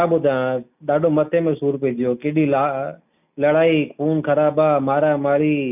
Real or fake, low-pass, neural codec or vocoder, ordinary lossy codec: real; 3.6 kHz; none; none